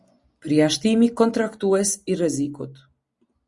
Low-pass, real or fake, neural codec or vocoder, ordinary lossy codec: 10.8 kHz; real; none; Opus, 64 kbps